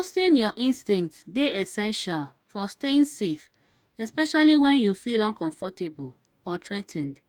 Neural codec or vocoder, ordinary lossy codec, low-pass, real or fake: codec, 44.1 kHz, 2.6 kbps, DAC; none; 19.8 kHz; fake